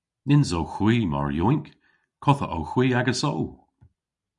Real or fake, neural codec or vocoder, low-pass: real; none; 10.8 kHz